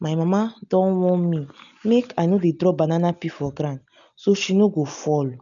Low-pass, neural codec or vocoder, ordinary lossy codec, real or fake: 7.2 kHz; none; none; real